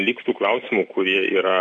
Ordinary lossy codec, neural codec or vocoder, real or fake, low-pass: AAC, 64 kbps; none; real; 10.8 kHz